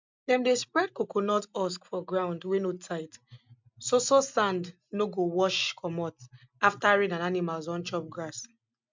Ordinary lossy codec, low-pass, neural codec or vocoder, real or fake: MP3, 64 kbps; 7.2 kHz; none; real